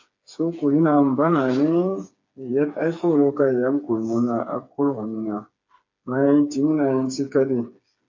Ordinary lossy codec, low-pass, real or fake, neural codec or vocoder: MP3, 48 kbps; 7.2 kHz; fake; codec, 16 kHz, 4 kbps, FreqCodec, smaller model